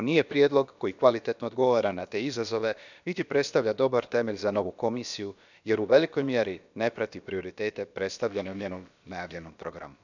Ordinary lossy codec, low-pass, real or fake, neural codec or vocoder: none; 7.2 kHz; fake; codec, 16 kHz, about 1 kbps, DyCAST, with the encoder's durations